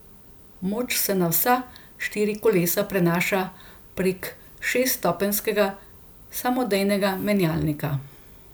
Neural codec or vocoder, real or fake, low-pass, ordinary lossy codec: none; real; none; none